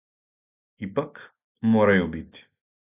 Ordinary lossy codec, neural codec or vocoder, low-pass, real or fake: AAC, 32 kbps; none; 3.6 kHz; real